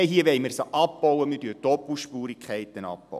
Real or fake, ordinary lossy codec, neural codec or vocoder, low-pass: real; none; none; 14.4 kHz